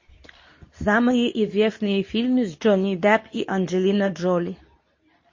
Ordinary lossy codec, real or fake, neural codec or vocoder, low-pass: MP3, 32 kbps; fake; codec, 24 kHz, 0.9 kbps, WavTokenizer, medium speech release version 2; 7.2 kHz